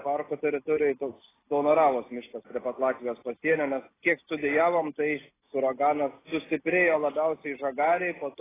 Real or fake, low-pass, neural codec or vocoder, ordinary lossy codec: real; 3.6 kHz; none; AAC, 16 kbps